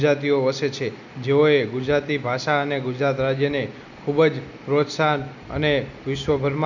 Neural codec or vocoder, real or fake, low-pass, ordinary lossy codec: none; real; 7.2 kHz; none